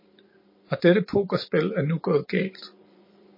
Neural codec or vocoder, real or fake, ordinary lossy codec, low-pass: vocoder, 44.1 kHz, 128 mel bands every 512 samples, BigVGAN v2; fake; MP3, 24 kbps; 5.4 kHz